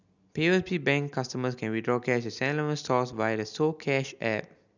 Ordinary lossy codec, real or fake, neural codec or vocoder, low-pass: none; real; none; 7.2 kHz